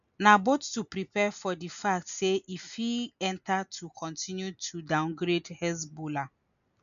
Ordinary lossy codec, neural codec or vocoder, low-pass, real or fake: none; none; 7.2 kHz; real